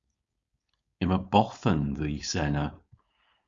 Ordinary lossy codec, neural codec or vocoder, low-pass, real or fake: Opus, 64 kbps; codec, 16 kHz, 4.8 kbps, FACodec; 7.2 kHz; fake